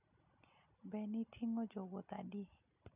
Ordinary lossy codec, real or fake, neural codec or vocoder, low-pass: none; real; none; 3.6 kHz